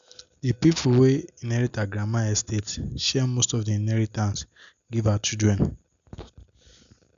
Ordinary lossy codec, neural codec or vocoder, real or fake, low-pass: none; none; real; 7.2 kHz